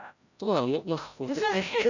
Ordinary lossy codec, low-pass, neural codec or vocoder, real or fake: none; 7.2 kHz; codec, 16 kHz, 0.5 kbps, FreqCodec, larger model; fake